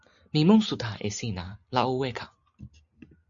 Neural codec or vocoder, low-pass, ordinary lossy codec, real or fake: none; 7.2 kHz; MP3, 96 kbps; real